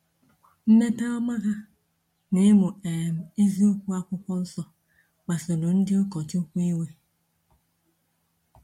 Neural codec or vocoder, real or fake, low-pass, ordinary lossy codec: none; real; 19.8 kHz; MP3, 64 kbps